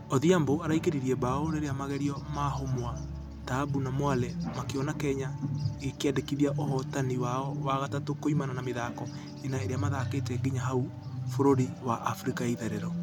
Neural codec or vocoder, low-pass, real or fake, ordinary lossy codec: none; 19.8 kHz; real; none